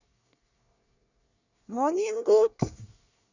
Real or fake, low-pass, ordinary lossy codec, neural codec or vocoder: fake; 7.2 kHz; none; codec, 24 kHz, 1 kbps, SNAC